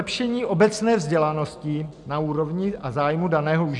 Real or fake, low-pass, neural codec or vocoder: real; 10.8 kHz; none